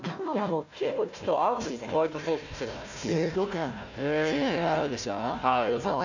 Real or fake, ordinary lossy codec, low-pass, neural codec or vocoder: fake; none; 7.2 kHz; codec, 16 kHz, 1 kbps, FunCodec, trained on Chinese and English, 50 frames a second